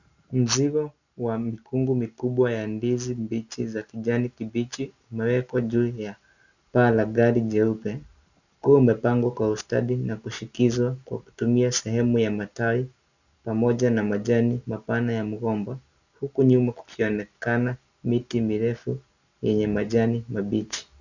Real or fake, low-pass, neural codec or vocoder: real; 7.2 kHz; none